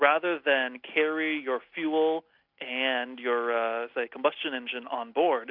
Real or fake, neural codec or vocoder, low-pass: real; none; 5.4 kHz